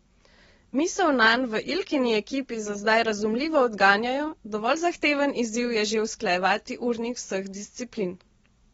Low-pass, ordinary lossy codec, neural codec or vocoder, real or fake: 19.8 kHz; AAC, 24 kbps; none; real